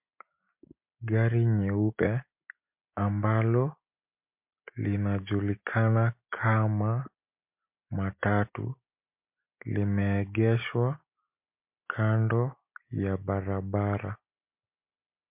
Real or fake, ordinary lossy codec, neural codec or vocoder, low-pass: real; MP3, 32 kbps; none; 3.6 kHz